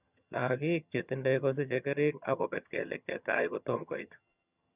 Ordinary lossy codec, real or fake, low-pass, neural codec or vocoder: none; fake; 3.6 kHz; vocoder, 22.05 kHz, 80 mel bands, HiFi-GAN